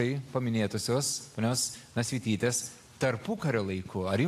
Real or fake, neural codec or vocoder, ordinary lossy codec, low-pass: real; none; AAC, 64 kbps; 14.4 kHz